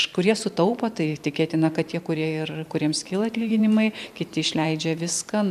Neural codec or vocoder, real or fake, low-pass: vocoder, 44.1 kHz, 128 mel bands every 512 samples, BigVGAN v2; fake; 14.4 kHz